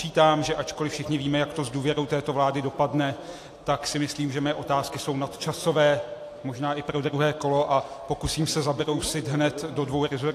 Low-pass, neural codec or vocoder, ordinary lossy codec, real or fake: 14.4 kHz; none; AAC, 64 kbps; real